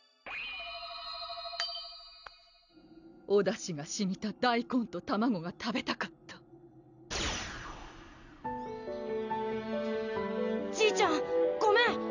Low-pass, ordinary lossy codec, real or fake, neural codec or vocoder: 7.2 kHz; none; real; none